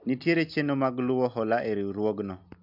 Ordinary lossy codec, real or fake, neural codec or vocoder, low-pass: MP3, 48 kbps; real; none; 5.4 kHz